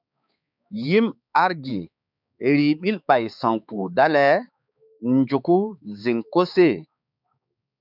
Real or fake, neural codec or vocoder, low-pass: fake; codec, 16 kHz, 4 kbps, X-Codec, HuBERT features, trained on balanced general audio; 5.4 kHz